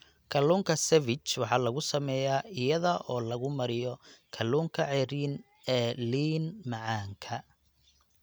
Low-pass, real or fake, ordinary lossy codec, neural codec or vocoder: none; real; none; none